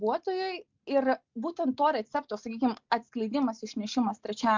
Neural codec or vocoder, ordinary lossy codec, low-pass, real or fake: none; MP3, 64 kbps; 7.2 kHz; real